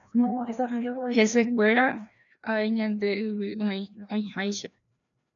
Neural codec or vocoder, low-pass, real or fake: codec, 16 kHz, 1 kbps, FreqCodec, larger model; 7.2 kHz; fake